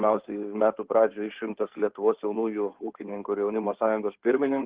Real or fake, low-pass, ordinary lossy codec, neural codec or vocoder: fake; 3.6 kHz; Opus, 16 kbps; codec, 16 kHz in and 24 kHz out, 2.2 kbps, FireRedTTS-2 codec